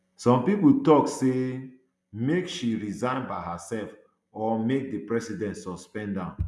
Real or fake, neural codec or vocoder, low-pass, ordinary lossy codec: real; none; none; none